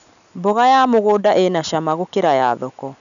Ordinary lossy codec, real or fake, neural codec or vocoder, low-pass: none; real; none; 7.2 kHz